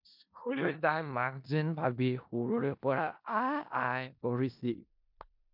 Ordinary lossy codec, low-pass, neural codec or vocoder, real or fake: MP3, 48 kbps; 5.4 kHz; codec, 16 kHz in and 24 kHz out, 0.4 kbps, LongCat-Audio-Codec, four codebook decoder; fake